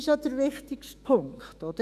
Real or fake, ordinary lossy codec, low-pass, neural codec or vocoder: fake; none; 14.4 kHz; autoencoder, 48 kHz, 128 numbers a frame, DAC-VAE, trained on Japanese speech